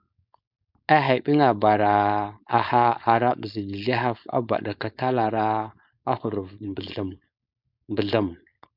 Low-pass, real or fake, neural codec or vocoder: 5.4 kHz; fake; codec, 16 kHz, 4.8 kbps, FACodec